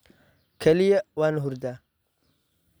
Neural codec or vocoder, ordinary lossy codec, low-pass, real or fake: none; none; none; real